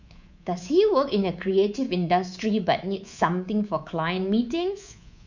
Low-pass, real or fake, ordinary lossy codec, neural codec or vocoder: 7.2 kHz; fake; none; codec, 24 kHz, 3.1 kbps, DualCodec